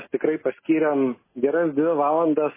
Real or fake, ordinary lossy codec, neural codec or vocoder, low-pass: real; MP3, 16 kbps; none; 3.6 kHz